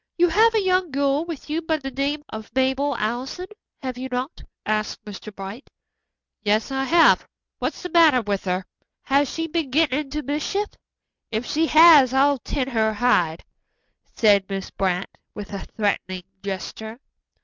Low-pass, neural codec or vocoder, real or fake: 7.2 kHz; none; real